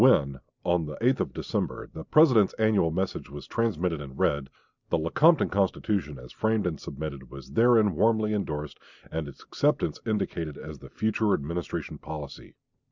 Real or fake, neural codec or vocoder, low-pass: real; none; 7.2 kHz